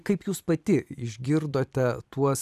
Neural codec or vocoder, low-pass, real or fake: vocoder, 44.1 kHz, 128 mel bands every 256 samples, BigVGAN v2; 14.4 kHz; fake